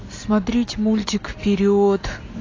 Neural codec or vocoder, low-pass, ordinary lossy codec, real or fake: none; 7.2 kHz; AAC, 32 kbps; real